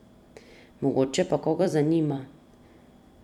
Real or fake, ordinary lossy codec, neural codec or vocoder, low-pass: fake; none; vocoder, 44.1 kHz, 128 mel bands every 256 samples, BigVGAN v2; 19.8 kHz